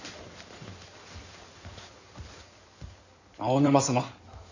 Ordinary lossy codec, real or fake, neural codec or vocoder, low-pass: none; fake; codec, 16 kHz in and 24 kHz out, 2.2 kbps, FireRedTTS-2 codec; 7.2 kHz